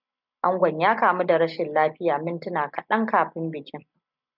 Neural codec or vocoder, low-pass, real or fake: none; 5.4 kHz; real